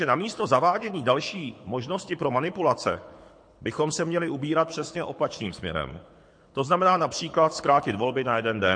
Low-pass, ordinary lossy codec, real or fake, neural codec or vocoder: 9.9 kHz; MP3, 48 kbps; fake; codec, 24 kHz, 6 kbps, HILCodec